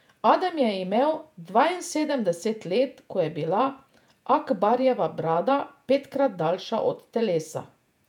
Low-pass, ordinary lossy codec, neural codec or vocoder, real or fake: 19.8 kHz; none; vocoder, 48 kHz, 128 mel bands, Vocos; fake